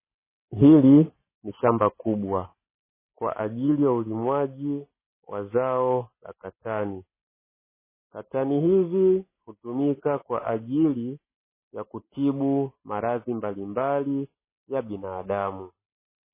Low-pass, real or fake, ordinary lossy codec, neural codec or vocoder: 3.6 kHz; real; MP3, 16 kbps; none